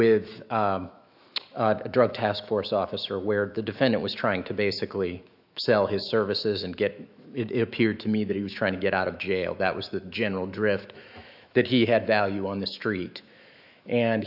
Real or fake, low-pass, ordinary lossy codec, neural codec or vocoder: fake; 5.4 kHz; AAC, 48 kbps; autoencoder, 48 kHz, 128 numbers a frame, DAC-VAE, trained on Japanese speech